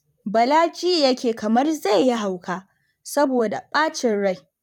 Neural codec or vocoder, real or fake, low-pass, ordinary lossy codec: vocoder, 44.1 kHz, 128 mel bands, Pupu-Vocoder; fake; 19.8 kHz; none